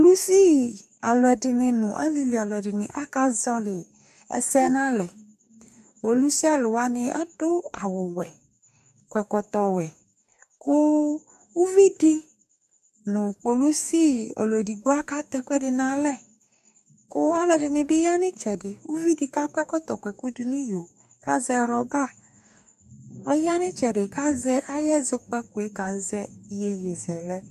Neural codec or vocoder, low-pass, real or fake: codec, 44.1 kHz, 2.6 kbps, DAC; 14.4 kHz; fake